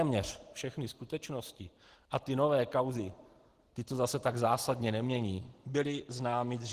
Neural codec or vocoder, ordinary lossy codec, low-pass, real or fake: none; Opus, 16 kbps; 14.4 kHz; real